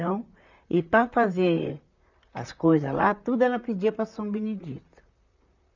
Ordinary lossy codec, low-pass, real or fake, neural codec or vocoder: none; 7.2 kHz; fake; vocoder, 44.1 kHz, 128 mel bands, Pupu-Vocoder